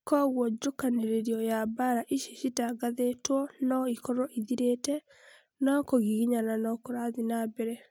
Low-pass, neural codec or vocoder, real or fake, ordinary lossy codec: 19.8 kHz; none; real; none